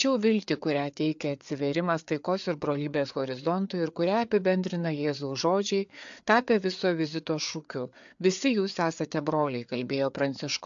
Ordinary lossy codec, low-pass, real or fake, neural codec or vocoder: AAC, 64 kbps; 7.2 kHz; fake; codec, 16 kHz, 4 kbps, FreqCodec, larger model